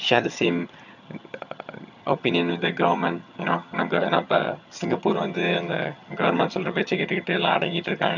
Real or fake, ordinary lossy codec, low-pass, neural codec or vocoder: fake; none; 7.2 kHz; vocoder, 22.05 kHz, 80 mel bands, HiFi-GAN